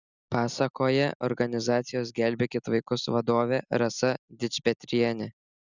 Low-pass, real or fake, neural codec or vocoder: 7.2 kHz; real; none